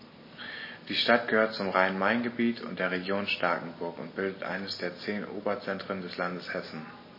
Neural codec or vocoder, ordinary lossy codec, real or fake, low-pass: none; MP3, 24 kbps; real; 5.4 kHz